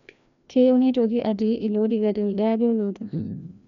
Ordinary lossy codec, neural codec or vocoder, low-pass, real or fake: none; codec, 16 kHz, 1 kbps, FreqCodec, larger model; 7.2 kHz; fake